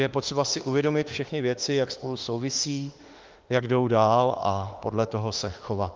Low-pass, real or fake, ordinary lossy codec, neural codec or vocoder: 7.2 kHz; fake; Opus, 24 kbps; autoencoder, 48 kHz, 32 numbers a frame, DAC-VAE, trained on Japanese speech